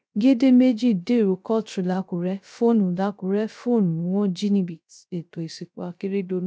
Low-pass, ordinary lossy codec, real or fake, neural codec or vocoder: none; none; fake; codec, 16 kHz, 0.3 kbps, FocalCodec